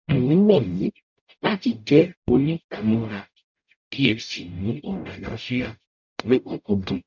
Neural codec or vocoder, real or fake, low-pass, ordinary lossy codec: codec, 44.1 kHz, 0.9 kbps, DAC; fake; 7.2 kHz; none